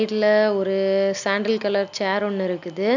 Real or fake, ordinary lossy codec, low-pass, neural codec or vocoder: real; none; 7.2 kHz; none